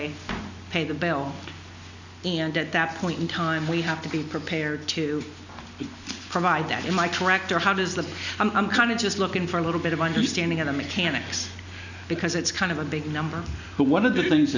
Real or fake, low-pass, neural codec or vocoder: real; 7.2 kHz; none